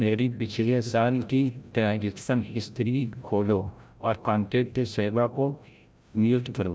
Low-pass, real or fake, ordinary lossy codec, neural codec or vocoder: none; fake; none; codec, 16 kHz, 0.5 kbps, FreqCodec, larger model